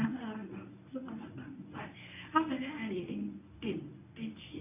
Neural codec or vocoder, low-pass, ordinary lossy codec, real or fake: codec, 24 kHz, 0.9 kbps, WavTokenizer, medium speech release version 1; 3.6 kHz; none; fake